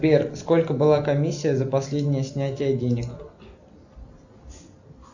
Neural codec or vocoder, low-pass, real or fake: none; 7.2 kHz; real